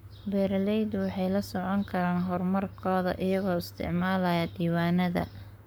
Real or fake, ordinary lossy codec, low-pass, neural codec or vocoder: fake; none; none; codec, 44.1 kHz, 7.8 kbps, Pupu-Codec